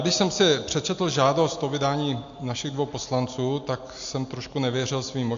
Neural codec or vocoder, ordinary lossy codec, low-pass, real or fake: none; AAC, 64 kbps; 7.2 kHz; real